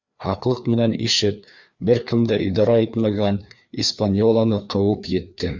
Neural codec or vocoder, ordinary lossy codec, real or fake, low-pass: codec, 16 kHz, 2 kbps, FreqCodec, larger model; Opus, 64 kbps; fake; 7.2 kHz